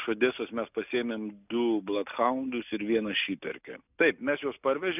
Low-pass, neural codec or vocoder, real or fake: 3.6 kHz; none; real